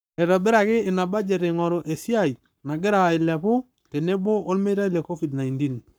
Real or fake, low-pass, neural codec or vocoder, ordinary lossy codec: fake; none; codec, 44.1 kHz, 7.8 kbps, Pupu-Codec; none